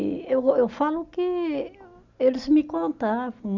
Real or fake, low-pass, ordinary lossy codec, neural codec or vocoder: real; 7.2 kHz; none; none